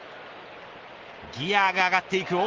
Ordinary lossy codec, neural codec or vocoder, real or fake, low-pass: Opus, 16 kbps; none; real; 7.2 kHz